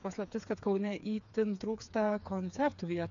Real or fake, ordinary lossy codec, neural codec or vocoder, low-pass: fake; MP3, 96 kbps; codec, 16 kHz, 4 kbps, FreqCodec, smaller model; 7.2 kHz